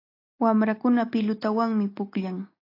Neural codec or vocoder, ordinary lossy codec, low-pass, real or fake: none; AAC, 32 kbps; 5.4 kHz; real